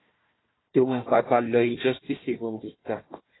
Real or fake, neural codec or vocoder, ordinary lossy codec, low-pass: fake; codec, 16 kHz, 1 kbps, FunCodec, trained on Chinese and English, 50 frames a second; AAC, 16 kbps; 7.2 kHz